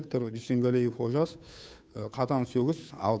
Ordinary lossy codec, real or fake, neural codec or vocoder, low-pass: none; fake; codec, 16 kHz, 2 kbps, FunCodec, trained on Chinese and English, 25 frames a second; none